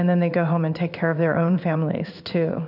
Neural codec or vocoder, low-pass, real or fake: codec, 16 kHz in and 24 kHz out, 1 kbps, XY-Tokenizer; 5.4 kHz; fake